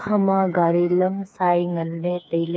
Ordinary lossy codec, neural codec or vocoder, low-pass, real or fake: none; codec, 16 kHz, 4 kbps, FreqCodec, smaller model; none; fake